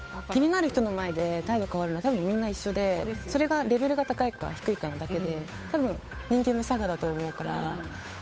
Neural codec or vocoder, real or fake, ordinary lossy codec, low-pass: codec, 16 kHz, 8 kbps, FunCodec, trained on Chinese and English, 25 frames a second; fake; none; none